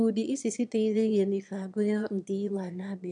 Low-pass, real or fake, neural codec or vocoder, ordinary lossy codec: 9.9 kHz; fake; autoencoder, 22.05 kHz, a latent of 192 numbers a frame, VITS, trained on one speaker; none